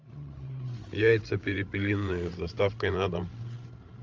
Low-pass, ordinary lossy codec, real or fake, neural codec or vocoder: 7.2 kHz; Opus, 32 kbps; fake; codec, 16 kHz, 16 kbps, FreqCodec, larger model